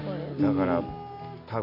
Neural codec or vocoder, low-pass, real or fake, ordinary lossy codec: none; 5.4 kHz; real; none